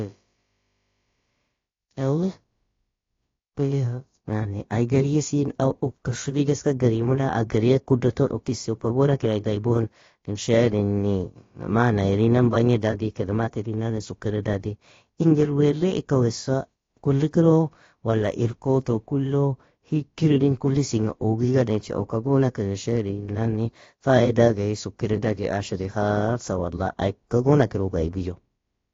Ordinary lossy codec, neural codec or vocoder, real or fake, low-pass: AAC, 32 kbps; codec, 16 kHz, about 1 kbps, DyCAST, with the encoder's durations; fake; 7.2 kHz